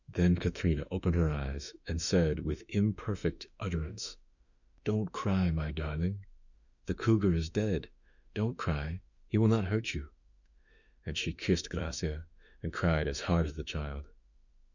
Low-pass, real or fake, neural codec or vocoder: 7.2 kHz; fake; autoencoder, 48 kHz, 32 numbers a frame, DAC-VAE, trained on Japanese speech